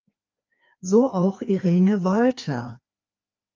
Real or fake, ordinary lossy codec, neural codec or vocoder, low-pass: fake; Opus, 24 kbps; codec, 16 kHz, 2 kbps, FreqCodec, larger model; 7.2 kHz